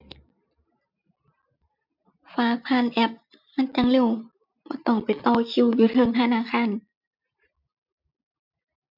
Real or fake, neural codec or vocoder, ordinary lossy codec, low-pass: real; none; none; 5.4 kHz